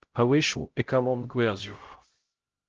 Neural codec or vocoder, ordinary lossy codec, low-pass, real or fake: codec, 16 kHz, 0.5 kbps, X-Codec, HuBERT features, trained on LibriSpeech; Opus, 16 kbps; 7.2 kHz; fake